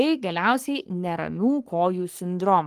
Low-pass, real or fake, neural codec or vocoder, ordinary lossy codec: 14.4 kHz; fake; codec, 44.1 kHz, 3.4 kbps, Pupu-Codec; Opus, 24 kbps